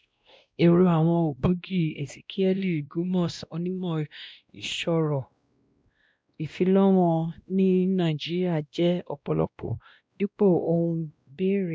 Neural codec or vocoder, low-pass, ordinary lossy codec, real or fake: codec, 16 kHz, 1 kbps, X-Codec, WavLM features, trained on Multilingual LibriSpeech; none; none; fake